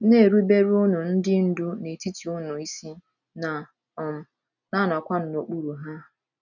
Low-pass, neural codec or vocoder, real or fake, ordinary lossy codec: 7.2 kHz; none; real; none